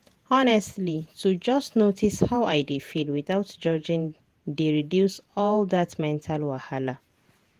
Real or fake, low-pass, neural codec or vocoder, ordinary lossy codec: fake; 14.4 kHz; vocoder, 48 kHz, 128 mel bands, Vocos; Opus, 24 kbps